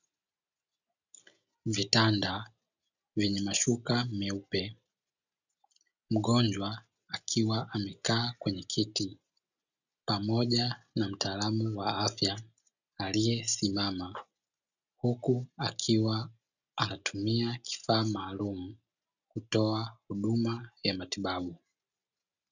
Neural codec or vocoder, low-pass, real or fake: none; 7.2 kHz; real